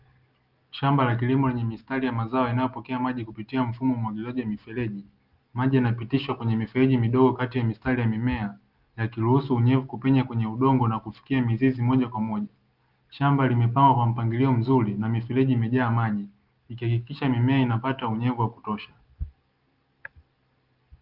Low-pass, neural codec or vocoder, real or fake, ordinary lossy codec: 5.4 kHz; none; real; Opus, 32 kbps